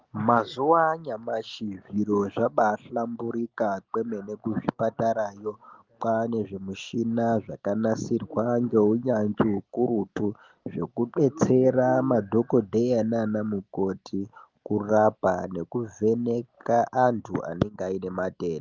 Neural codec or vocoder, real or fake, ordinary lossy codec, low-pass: none; real; Opus, 32 kbps; 7.2 kHz